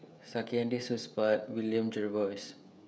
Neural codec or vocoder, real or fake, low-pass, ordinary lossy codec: codec, 16 kHz, 16 kbps, FreqCodec, smaller model; fake; none; none